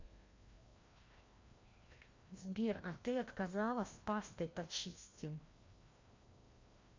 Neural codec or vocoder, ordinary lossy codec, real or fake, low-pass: codec, 16 kHz, 1 kbps, FreqCodec, larger model; MP3, 48 kbps; fake; 7.2 kHz